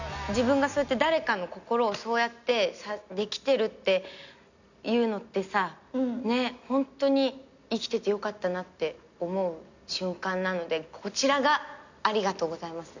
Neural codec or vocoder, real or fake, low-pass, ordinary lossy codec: none; real; 7.2 kHz; none